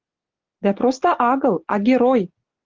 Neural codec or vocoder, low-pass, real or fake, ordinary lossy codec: none; 7.2 kHz; real; Opus, 16 kbps